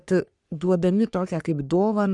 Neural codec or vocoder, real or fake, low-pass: codec, 44.1 kHz, 3.4 kbps, Pupu-Codec; fake; 10.8 kHz